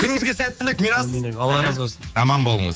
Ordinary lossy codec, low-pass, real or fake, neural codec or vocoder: none; none; fake; codec, 16 kHz, 4 kbps, X-Codec, HuBERT features, trained on general audio